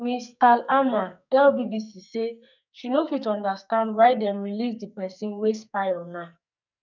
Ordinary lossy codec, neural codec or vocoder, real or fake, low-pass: none; codec, 44.1 kHz, 2.6 kbps, SNAC; fake; 7.2 kHz